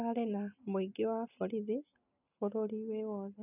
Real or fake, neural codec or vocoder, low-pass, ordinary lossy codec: real; none; 3.6 kHz; none